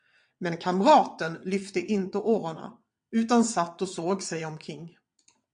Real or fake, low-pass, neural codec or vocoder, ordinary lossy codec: fake; 9.9 kHz; vocoder, 22.05 kHz, 80 mel bands, WaveNeXt; MP3, 64 kbps